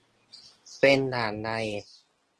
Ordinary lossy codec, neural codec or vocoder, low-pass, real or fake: Opus, 16 kbps; none; 10.8 kHz; real